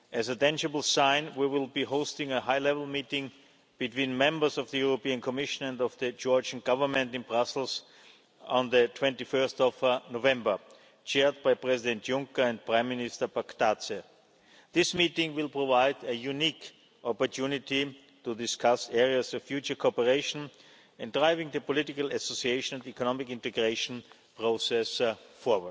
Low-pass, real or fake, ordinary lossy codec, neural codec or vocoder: none; real; none; none